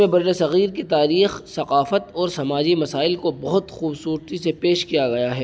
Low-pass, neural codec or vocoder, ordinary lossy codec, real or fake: none; none; none; real